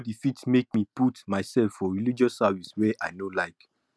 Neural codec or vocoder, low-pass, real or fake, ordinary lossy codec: none; none; real; none